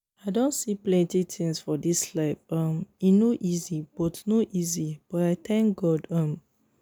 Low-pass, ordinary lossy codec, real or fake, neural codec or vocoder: none; none; real; none